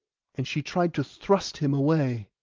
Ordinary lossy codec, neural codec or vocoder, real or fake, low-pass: Opus, 32 kbps; none; real; 7.2 kHz